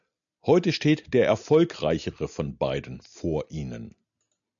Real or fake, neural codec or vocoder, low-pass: real; none; 7.2 kHz